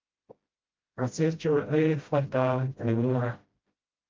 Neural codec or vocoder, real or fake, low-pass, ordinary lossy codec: codec, 16 kHz, 0.5 kbps, FreqCodec, smaller model; fake; 7.2 kHz; Opus, 24 kbps